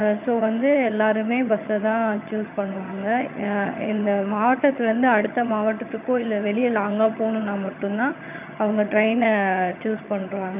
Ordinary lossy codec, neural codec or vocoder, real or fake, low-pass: none; vocoder, 22.05 kHz, 80 mel bands, HiFi-GAN; fake; 3.6 kHz